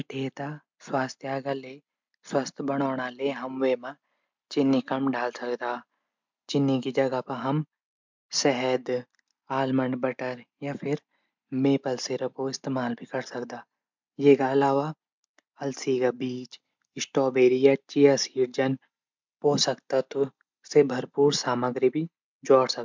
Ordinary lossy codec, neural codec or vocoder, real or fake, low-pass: MP3, 64 kbps; none; real; 7.2 kHz